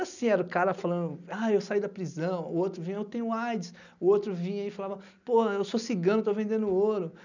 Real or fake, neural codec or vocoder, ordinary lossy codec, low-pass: real; none; none; 7.2 kHz